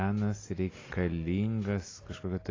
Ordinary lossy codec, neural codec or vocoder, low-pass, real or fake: AAC, 32 kbps; none; 7.2 kHz; real